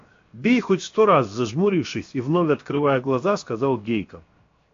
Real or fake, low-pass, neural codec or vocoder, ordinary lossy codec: fake; 7.2 kHz; codec, 16 kHz, 0.7 kbps, FocalCodec; AAC, 48 kbps